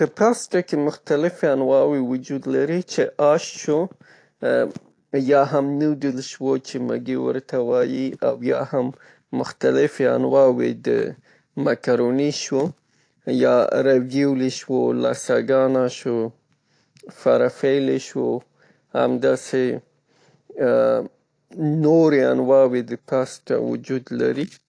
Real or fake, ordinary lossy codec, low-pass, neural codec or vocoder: real; AAC, 48 kbps; 9.9 kHz; none